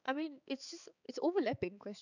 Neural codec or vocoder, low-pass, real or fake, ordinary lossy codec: codec, 16 kHz, 4 kbps, X-Codec, HuBERT features, trained on balanced general audio; 7.2 kHz; fake; none